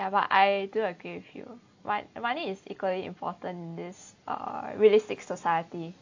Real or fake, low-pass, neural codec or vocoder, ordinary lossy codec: real; 7.2 kHz; none; AAC, 48 kbps